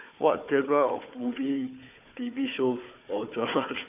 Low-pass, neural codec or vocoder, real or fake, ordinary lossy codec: 3.6 kHz; codec, 16 kHz, 4 kbps, FunCodec, trained on Chinese and English, 50 frames a second; fake; none